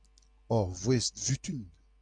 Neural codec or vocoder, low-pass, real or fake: none; 9.9 kHz; real